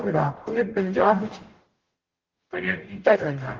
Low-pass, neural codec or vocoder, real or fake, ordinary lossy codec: 7.2 kHz; codec, 44.1 kHz, 0.9 kbps, DAC; fake; Opus, 16 kbps